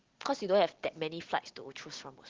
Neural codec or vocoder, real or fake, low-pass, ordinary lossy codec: none; real; 7.2 kHz; Opus, 16 kbps